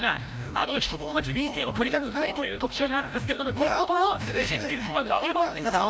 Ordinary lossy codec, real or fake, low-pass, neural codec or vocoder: none; fake; none; codec, 16 kHz, 0.5 kbps, FreqCodec, larger model